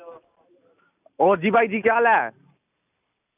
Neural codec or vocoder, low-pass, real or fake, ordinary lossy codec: none; 3.6 kHz; real; none